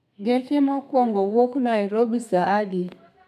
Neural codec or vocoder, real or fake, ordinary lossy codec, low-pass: codec, 32 kHz, 1.9 kbps, SNAC; fake; none; 14.4 kHz